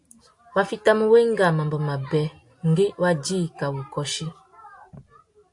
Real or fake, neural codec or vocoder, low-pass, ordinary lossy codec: real; none; 10.8 kHz; AAC, 64 kbps